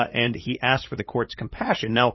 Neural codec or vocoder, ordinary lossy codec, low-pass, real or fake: codec, 16 kHz, 8 kbps, FunCodec, trained on LibriTTS, 25 frames a second; MP3, 24 kbps; 7.2 kHz; fake